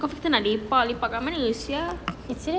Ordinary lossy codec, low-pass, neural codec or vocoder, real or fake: none; none; none; real